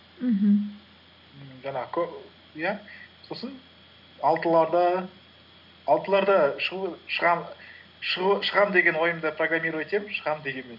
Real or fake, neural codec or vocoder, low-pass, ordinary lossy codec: real; none; 5.4 kHz; none